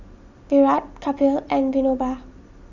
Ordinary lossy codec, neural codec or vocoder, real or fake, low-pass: none; none; real; 7.2 kHz